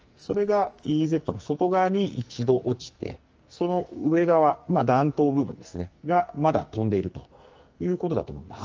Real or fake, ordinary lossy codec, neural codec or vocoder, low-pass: fake; Opus, 24 kbps; codec, 44.1 kHz, 2.6 kbps, SNAC; 7.2 kHz